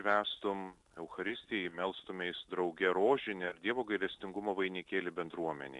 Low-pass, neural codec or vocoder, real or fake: 10.8 kHz; none; real